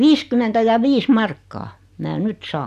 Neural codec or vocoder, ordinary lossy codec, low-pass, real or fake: none; none; 14.4 kHz; real